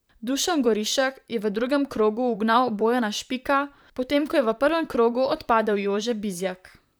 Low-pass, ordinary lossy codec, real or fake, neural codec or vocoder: none; none; fake; vocoder, 44.1 kHz, 128 mel bands, Pupu-Vocoder